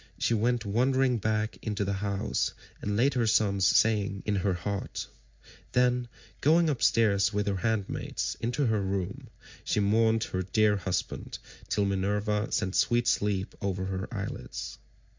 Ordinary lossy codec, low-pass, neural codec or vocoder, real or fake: MP3, 64 kbps; 7.2 kHz; none; real